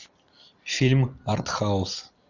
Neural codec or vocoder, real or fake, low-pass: none; real; 7.2 kHz